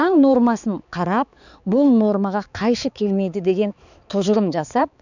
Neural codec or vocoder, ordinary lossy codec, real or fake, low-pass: codec, 16 kHz, 4 kbps, X-Codec, HuBERT features, trained on balanced general audio; none; fake; 7.2 kHz